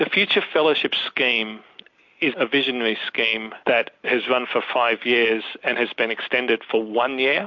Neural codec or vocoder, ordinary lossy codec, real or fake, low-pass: none; MP3, 48 kbps; real; 7.2 kHz